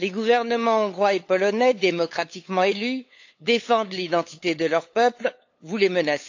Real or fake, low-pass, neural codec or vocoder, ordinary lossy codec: fake; 7.2 kHz; codec, 16 kHz, 8 kbps, FunCodec, trained on LibriTTS, 25 frames a second; AAC, 48 kbps